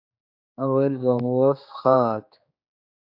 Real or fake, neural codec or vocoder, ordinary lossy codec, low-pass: fake; codec, 16 kHz, 2 kbps, X-Codec, HuBERT features, trained on general audio; AAC, 48 kbps; 5.4 kHz